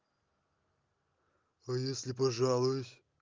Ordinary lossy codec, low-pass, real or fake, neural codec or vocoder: Opus, 24 kbps; 7.2 kHz; real; none